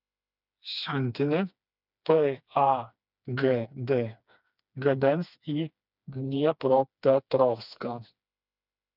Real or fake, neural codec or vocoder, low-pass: fake; codec, 16 kHz, 2 kbps, FreqCodec, smaller model; 5.4 kHz